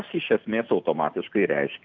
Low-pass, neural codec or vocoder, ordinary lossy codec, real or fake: 7.2 kHz; none; MP3, 64 kbps; real